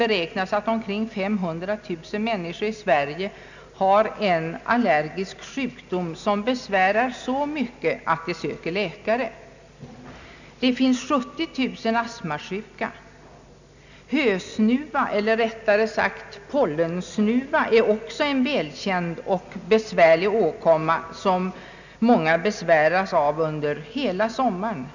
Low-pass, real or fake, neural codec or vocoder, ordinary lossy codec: 7.2 kHz; real; none; none